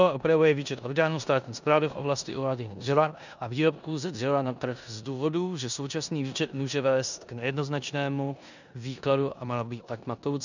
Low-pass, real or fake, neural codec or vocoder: 7.2 kHz; fake; codec, 16 kHz in and 24 kHz out, 0.9 kbps, LongCat-Audio-Codec, four codebook decoder